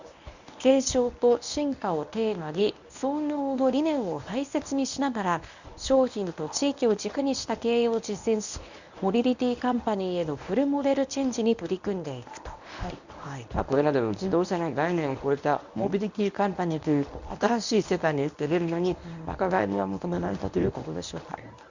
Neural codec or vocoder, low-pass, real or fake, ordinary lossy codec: codec, 24 kHz, 0.9 kbps, WavTokenizer, medium speech release version 1; 7.2 kHz; fake; none